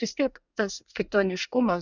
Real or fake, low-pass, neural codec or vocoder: fake; 7.2 kHz; codec, 24 kHz, 1 kbps, SNAC